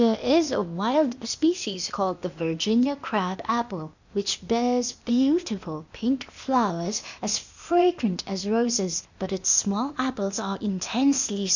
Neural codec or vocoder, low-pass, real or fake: codec, 16 kHz, 0.8 kbps, ZipCodec; 7.2 kHz; fake